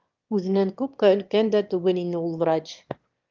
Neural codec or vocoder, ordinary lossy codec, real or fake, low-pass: autoencoder, 22.05 kHz, a latent of 192 numbers a frame, VITS, trained on one speaker; Opus, 32 kbps; fake; 7.2 kHz